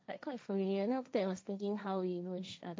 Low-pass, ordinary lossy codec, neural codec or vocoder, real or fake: none; none; codec, 16 kHz, 1.1 kbps, Voila-Tokenizer; fake